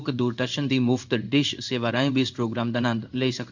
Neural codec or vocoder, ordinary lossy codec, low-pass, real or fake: codec, 16 kHz in and 24 kHz out, 1 kbps, XY-Tokenizer; none; 7.2 kHz; fake